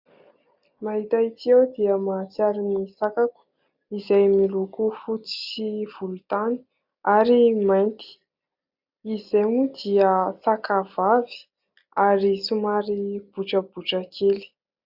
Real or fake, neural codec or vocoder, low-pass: real; none; 5.4 kHz